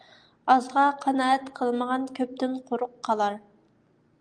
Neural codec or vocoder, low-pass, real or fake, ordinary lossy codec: none; 9.9 kHz; real; Opus, 32 kbps